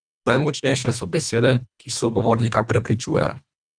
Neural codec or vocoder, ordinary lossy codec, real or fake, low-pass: codec, 24 kHz, 1.5 kbps, HILCodec; none; fake; 9.9 kHz